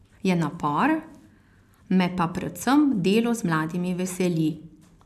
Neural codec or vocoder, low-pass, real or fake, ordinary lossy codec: none; 14.4 kHz; real; none